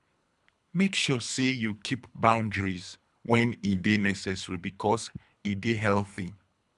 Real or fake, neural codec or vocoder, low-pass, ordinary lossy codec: fake; codec, 24 kHz, 3 kbps, HILCodec; 10.8 kHz; none